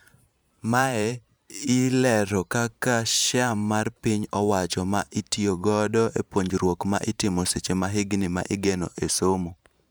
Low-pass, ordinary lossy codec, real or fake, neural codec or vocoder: none; none; fake; vocoder, 44.1 kHz, 128 mel bands every 512 samples, BigVGAN v2